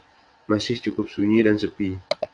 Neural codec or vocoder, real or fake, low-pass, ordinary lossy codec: vocoder, 22.05 kHz, 80 mel bands, WaveNeXt; fake; 9.9 kHz; AAC, 64 kbps